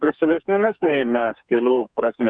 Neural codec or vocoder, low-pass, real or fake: codec, 32 kHz, 1.9 kbps, SNAC; 9.9 kHz; fake